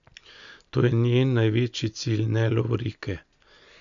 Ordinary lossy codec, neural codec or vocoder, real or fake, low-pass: none; none; real; 7.2 kHz